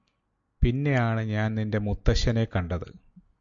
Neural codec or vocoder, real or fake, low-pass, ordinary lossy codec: none; real; 7.2 kHz; Opus, 64 kbps